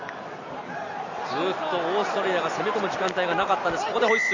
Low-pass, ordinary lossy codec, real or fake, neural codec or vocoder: 7.2 kHz; none; real; none